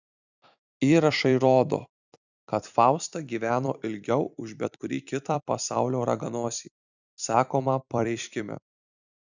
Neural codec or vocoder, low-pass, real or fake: vocoder, 44.1 kHz, 128 mel bands every 256 samples, BigVGAN v2; 7.2 kHz; fake